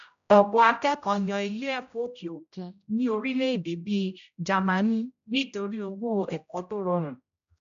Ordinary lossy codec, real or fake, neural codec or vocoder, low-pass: none; fake; codec, 16 kHz, 0.5 kbps, X-Codec, HuBERT features, trained on general audio; 7.2 kHz